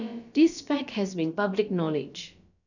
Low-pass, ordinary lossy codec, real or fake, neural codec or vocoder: 7.2 kHz; none; fake; codec, 16 kHz, about 1 kbps, DyCAST, with the encoder's durations